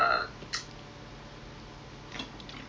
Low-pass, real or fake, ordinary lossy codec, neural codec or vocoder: none; real; none; none